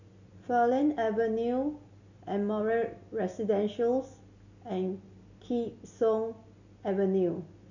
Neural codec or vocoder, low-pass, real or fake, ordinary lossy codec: none; 7.2 kHz; real; none